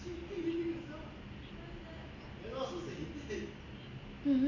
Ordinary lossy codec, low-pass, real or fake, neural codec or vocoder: none; 7.2 kHz; real; none